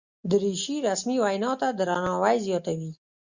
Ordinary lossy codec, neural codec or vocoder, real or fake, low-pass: Opus, 64 kbps; none; real; 7.2 kHz